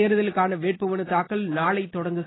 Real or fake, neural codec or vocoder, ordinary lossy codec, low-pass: real; none; AAC, 16 kbps; 7.2 kHz